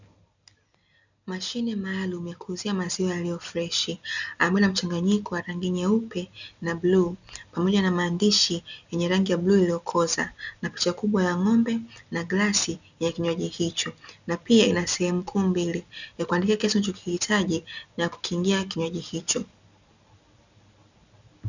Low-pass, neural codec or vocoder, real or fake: 7.2 kHz; none; real